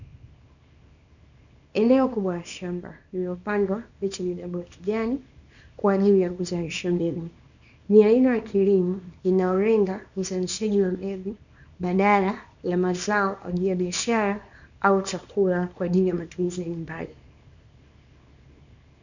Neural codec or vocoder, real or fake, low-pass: codec, 24 kHz, 0.9 kbps, WavTokenizer, small release; fake; 7.2 kHz